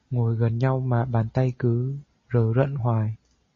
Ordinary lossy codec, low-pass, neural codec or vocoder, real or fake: MP3, 32 kbps; 7.2 kHz; none; real